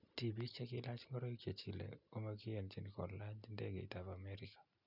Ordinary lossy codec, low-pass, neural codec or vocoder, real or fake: none; 5.4 kHz; none; real